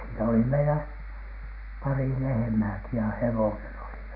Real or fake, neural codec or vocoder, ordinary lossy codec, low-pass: real; none; none; 5.4 kHz